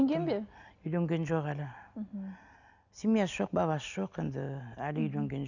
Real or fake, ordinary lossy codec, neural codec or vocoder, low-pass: real; none; none; 7.2 kHz